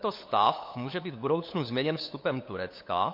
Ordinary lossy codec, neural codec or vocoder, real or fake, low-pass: MP3, 32 kbps; codec, 16 kHz, 8 kbps, FunCodec, trained on LibriTTS, 25 frames a second; fake; 5.4 kHz